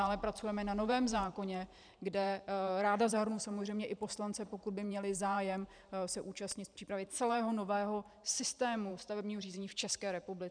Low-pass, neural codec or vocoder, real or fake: 9.9 kHz; vocoder, 44.1 kHz, 128 mel bands every 512 samples, BigVGAN v2; fake